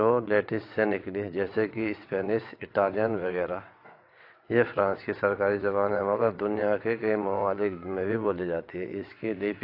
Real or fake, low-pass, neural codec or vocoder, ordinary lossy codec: fake; 5.4 kHz; vocoder, 22.05 kHz, 80 mel bands, WaveNeXt; AAC, 32 kbps